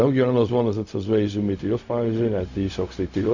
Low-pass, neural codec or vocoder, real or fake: 7.2 kHz; codec, 16 kHz, 0.4 kbps, LongCat-Audio-Codec; fake